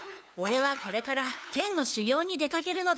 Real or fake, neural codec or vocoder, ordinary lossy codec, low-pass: fake; codec, 16 kHz, 2 kbps, FunCodec, trained on LibriTTS, 25 frames a second; none; none